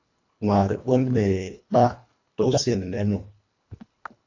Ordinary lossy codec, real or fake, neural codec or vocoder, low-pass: AAC, 48 kbps; fake; codec, 24 kHz, 1.5 kbps, HILCodec; 7.2 kHz